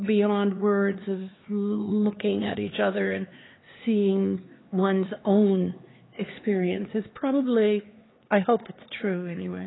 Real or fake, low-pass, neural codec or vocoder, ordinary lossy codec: fake; 7.2 kHz; codec, 16 kHz, 4 kbps, X-Codec, HuBERT features, trained on LibriSpeech; AAC, 16 kbps